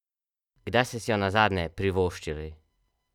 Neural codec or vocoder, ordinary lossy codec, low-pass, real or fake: none; none; 19.8 kHz; real